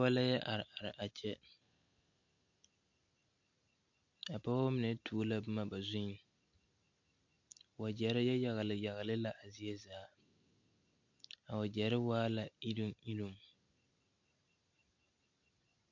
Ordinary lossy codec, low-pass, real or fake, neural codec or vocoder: MP3, 48 kbps; 7.2 kHz; real; none